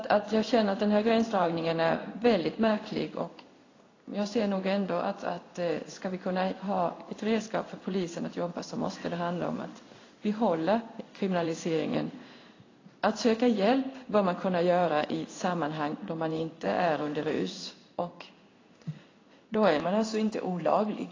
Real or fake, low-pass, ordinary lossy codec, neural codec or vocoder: fake; 7.2 kHz; AAC, 32 kbps; codec, 16 kHz in and 24 kHz out, 1 kbps, XY-Tokenizer